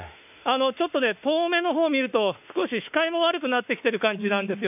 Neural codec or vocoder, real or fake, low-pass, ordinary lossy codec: autoencoder, 48 kHz, 32 numbers a frame, DAC-VAE, trained on Japanese speech; fake; 3.6 kHz; none